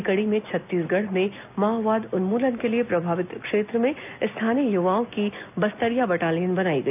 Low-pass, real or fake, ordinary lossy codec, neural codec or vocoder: 3.6 kHz; real; none; none